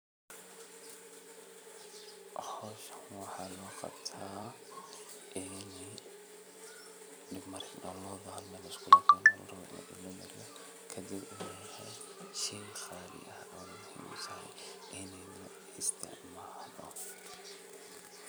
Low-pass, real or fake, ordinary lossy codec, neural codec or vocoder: none; fake; none; vocoder, 44.1 kHz, 128 mel bands every 256 samples, BigVGAN v2